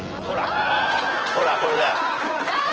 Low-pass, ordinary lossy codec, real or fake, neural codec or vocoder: 7.2 kHz; Opus, 16 kbps; fake; vocoder, 24 kHz, 100 mel bands, Vocos